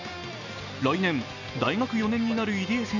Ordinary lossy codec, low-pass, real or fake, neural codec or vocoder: none; 7.2 kHz; real; none